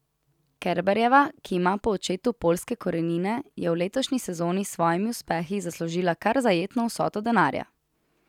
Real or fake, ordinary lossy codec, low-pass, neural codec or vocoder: real; none; 19.8 kHz; none